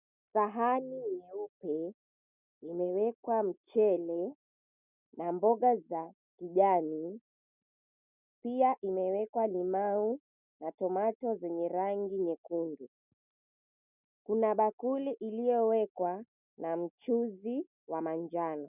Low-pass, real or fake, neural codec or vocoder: 3.6 kHz; real; none